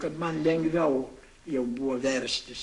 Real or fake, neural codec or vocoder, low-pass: fake; codec, 44.1 kHz, 7.8 kbps, Pupu-Codec; 10.8 kHz